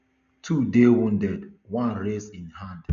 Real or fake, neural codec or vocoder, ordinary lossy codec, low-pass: real; none; none; 7.2 kHz